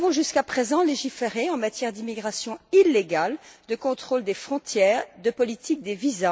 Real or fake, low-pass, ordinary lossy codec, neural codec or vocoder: real; none; none; none